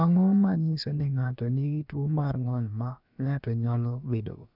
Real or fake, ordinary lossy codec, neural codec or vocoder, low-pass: fake; none; codec, 16 kHz, about 1 kbps, DyCAST, with the encoder's durations; 5.4 kHz